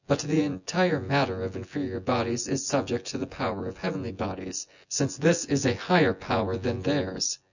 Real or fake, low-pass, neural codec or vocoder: fake; 7.2 kHz; vocoder, 24 kHz, 100 mel bands, Vocos